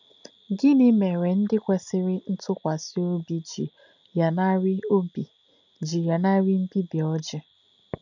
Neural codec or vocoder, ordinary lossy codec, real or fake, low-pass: none; none; real; 7.2 kHz